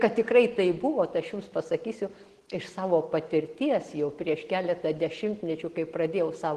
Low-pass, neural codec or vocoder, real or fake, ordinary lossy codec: 10.8 kHz; none; real; Opus, 16 kbps